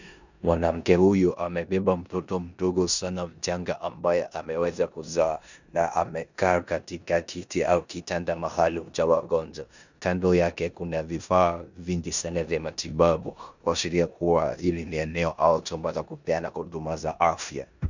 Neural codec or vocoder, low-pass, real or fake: codec, 16 kHz in and 24 kHz out, 0.9 kbps, LongCat-Audio-Codec, four codebook decoder; 7.2 kHz; fake